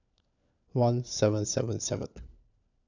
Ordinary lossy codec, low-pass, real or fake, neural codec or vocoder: none; 7.2 kHz; fake; codec, 16 kHz, 4 kbps, FunCodec, trained on LibriTTS, 50 frames a second